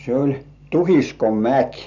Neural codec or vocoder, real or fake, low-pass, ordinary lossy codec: none; real; 7.2 kHz; none